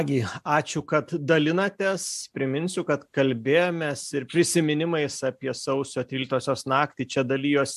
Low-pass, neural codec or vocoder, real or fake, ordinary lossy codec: 14.4 kHz; none; real; MP3, 96 kbps